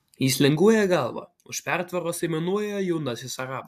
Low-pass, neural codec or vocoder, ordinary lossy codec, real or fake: 14.4 kHz; none; AAC, 96 kbps; real